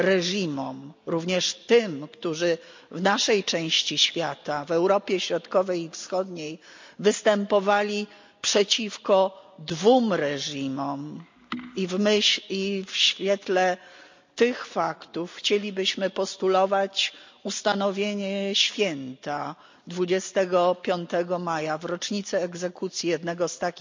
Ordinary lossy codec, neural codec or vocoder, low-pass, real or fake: MP3, 64 kbps; none; 7.2 kHz; real